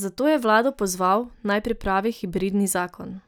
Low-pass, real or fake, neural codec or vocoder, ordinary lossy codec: none; real; none; none